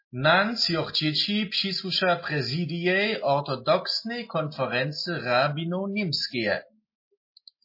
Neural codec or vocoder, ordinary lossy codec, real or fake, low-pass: none; MP3, 24 kbps; real; 5.4 kHz